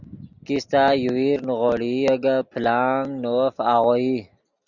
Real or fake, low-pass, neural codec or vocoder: real; 7.2 kHz; none